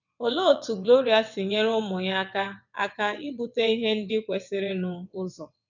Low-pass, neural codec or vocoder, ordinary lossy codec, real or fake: 7.2 kHz; vocoder, 22.05 kHz, 80 mel bands, WaveNeXt; none; fake